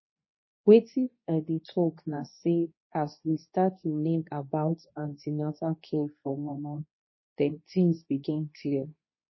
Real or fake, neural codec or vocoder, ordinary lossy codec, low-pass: fake; codec, 24 kHz, 0.9 kbps, WavTokenizer, medium speech release version 2; MP3, 24 kbps; 7.2 kHz